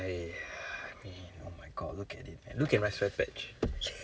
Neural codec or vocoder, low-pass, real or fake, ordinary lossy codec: none; none; real; none